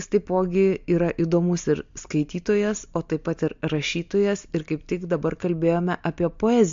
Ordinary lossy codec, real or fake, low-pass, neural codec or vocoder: MP3, 48 kbps; real; 7.2 kHz; none